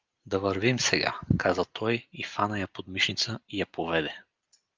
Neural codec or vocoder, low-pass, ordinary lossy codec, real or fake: none; 7.2 kHz; Opus, 32 kbps; real